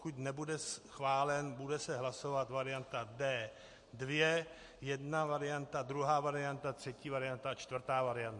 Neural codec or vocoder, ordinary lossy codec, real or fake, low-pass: none; MP3, 48 kbps; real; 10.8 kHz